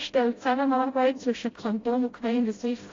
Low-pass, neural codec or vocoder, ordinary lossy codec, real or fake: 7.2 kHz; codec, 16 kHz, 0.5 kbps, FreqCodec, smaller model; AAC, 32 kbps; fake